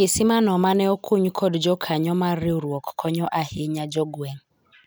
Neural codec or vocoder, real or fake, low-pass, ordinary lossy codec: none; real; none; none